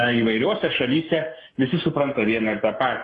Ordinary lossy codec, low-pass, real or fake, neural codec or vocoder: AAC, 32 kbps; 10.8 kHz; fake; codec, 44.1 kHz, 7.8 kbps, Pupu-Codec